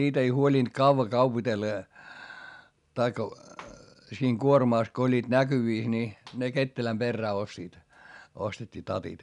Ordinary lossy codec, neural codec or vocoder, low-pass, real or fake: none; none; 10.8 kHz; real